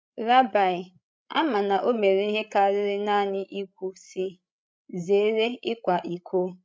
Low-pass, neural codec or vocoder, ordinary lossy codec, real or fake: none; codec, 16 kHz, 16 kbps, FreqCodec, larger model; none; fake